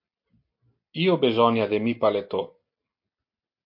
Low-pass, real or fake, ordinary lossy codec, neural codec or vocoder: 5.4 kHz; real; MP3, 32 kbps; none